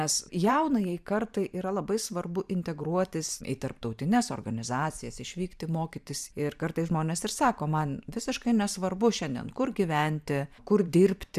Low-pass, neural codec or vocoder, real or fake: 14.4 kHz; vocoder, 44.1 kHz, 128 mel bands every 512 samples, BigVGAN v2; fake